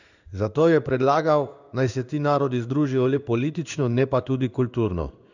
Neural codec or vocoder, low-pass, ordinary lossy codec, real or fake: codec, 16 kHz in and 24 kHz out, 1 kbps, XY-Tokenizer; 7.2 kHz; none; fake